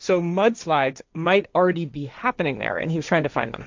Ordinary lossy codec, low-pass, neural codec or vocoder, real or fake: MP3, 64 kbps; 7.2 kHz; codec, 16 kHz, 1.1 kbps, Voila-Tokenizer; fake